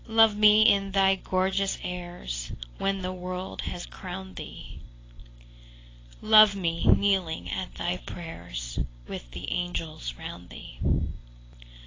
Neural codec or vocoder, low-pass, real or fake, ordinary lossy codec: none; 7.2 kHz; real; AAC, 32 kbps